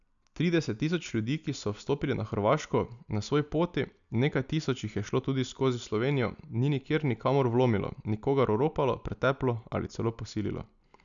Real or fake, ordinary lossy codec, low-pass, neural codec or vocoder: real; none; 7.2 kHz; none